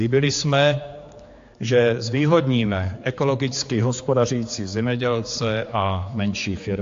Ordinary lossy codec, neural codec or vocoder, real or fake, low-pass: AAC, 48 kbps; codec, 16 kHz, 4 kbps, X-Codec, HuBERT features, trained on general audio; fake; 7.2 kHz